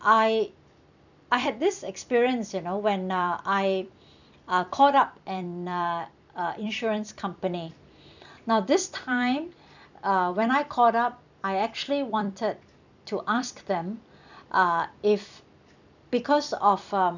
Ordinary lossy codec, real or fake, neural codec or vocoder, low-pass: none; fake; vocoder, 44.1 kHz, 128 mel bands every 256 samples, BigVGAN v2; 7.2 kHz